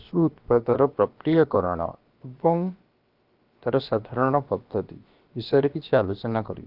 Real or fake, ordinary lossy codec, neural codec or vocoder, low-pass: fake; Opus, 32 kbps; codec, 16 kHz, about 1 kbps, DyCAST, with the encoder's durations; 5.4 kHz